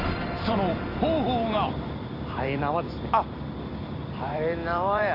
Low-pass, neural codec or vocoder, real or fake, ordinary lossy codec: 5.4 kHz; vocoder, 44.1 kHz, 128 mel bands every 256 samples, BigVGAN v2; fake; AAC, 24 kbps